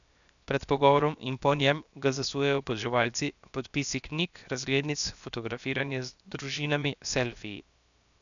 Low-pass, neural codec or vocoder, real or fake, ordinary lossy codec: 7.2 kHz; codec, 16 kHz, 0.7 kbps, FocalCodec; fake; none